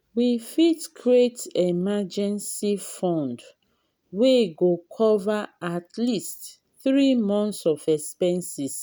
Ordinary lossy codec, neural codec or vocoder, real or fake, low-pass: none; none; real; none